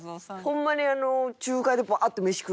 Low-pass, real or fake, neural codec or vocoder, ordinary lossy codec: none; real; none; none